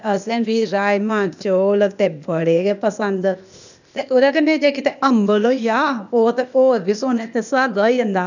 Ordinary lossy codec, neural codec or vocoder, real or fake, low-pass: none; codec, 16 kHz, 0.8 kbps, ZipCodec; fake; 7.2 kHz